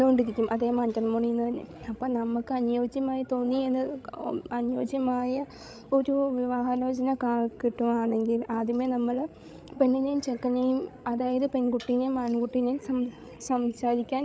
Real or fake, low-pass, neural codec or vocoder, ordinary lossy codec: fake; none; codec, 16 kHz, 16 kbps, FreqCodec, larger model; none